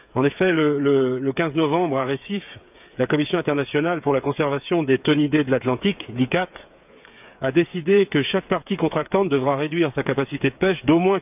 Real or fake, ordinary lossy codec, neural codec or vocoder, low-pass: fake; none; codec, 16 kHz, 8 kbps, FreqCodec, smaller model; 3.6 kHz